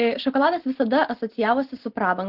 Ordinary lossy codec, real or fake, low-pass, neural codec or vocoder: Opus, 16 kbps; real; 5.4 kHz; none